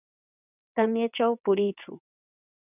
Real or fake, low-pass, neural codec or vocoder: fake; 3.6 kHz; vocoder, 22.05 kHz, 80 mel bands, WaveNeXt